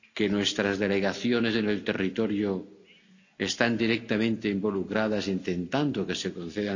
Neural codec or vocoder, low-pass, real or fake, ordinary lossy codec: none; 7.2 kHz; real; none